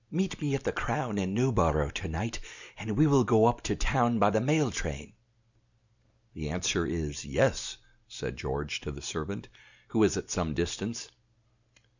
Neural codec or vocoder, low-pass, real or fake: none; 7.2 kHz; real